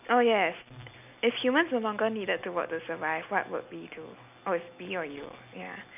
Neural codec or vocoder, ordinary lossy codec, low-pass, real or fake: none; none; 3.6 kHz; real